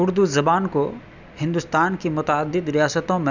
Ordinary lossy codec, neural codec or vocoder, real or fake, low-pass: none; none; real; 7.2 kHz